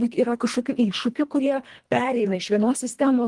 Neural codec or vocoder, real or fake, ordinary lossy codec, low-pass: codec, 24 kHz, 1.5 kbps, HILCodec; fake; Opus, 24 kbps; 10.8 kHz